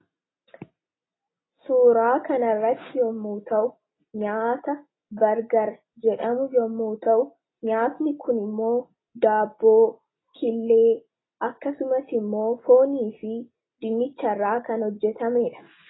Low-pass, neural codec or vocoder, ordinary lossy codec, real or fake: 7.2 kHz; none; AAC, 16 kbps; real